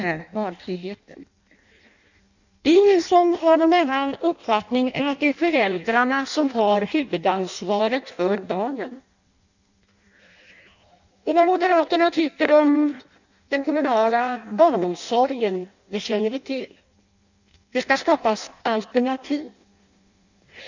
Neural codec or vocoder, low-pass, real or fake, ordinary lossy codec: codec, 16 kHz in and 24 kHz out, 0.6 kbps, FireRedTTS-2 codec; 7.2 kHz; fake; none